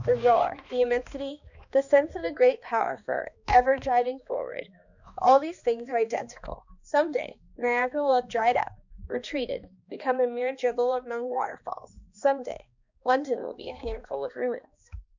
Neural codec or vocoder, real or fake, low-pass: codec, 16 kHz, 2 kbps, X-Codec, HuBERT features, trained on balanced general audio; fake; 7.2 kHz